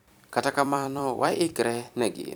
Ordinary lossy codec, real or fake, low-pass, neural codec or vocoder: none; fake; none; vocoder, 44.1 kHz, 128 mel bands every 256 samples, BigVGAN v2